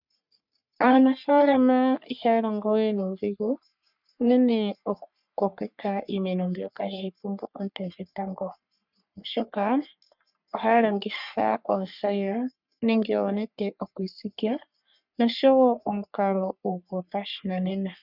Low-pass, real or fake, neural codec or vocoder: 5.4 kHz; fake; codec, 44.1 kHz, 3.4 kbps, Pupu-Codec